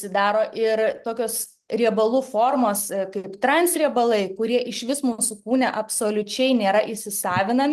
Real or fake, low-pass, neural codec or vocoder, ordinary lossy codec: real; 14.4 kHz; none; Opus, 24 kbps